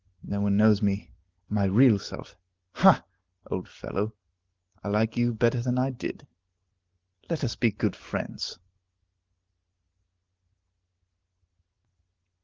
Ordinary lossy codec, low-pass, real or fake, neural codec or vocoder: Opus, 16 kbps; 7.2 kHz; real; none